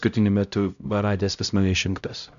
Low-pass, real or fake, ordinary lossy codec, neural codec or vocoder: 7.2 kHz; fake; MP3, 96 kbps; codec, 16 kHz, 0.5 kbps, X-Codec, HuBERT features, trained on LibriSpeech